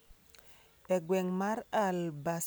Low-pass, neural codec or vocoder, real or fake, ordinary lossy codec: none; none; real; none